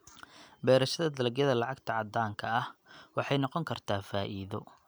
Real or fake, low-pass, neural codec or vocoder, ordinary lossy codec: real; none; none; none